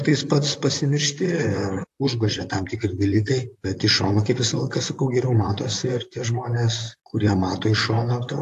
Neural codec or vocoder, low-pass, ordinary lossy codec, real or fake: vocoder, 44.1 kHz, 128 mel bands, Pupu-Vocoder; 14.4 kHz; AAC, 64 kbps; fake